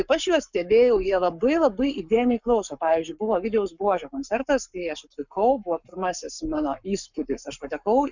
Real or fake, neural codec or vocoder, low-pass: fake; codec, 44.1 kHz, 7.8 kbps, Pupu-Codec; 7.2 kHz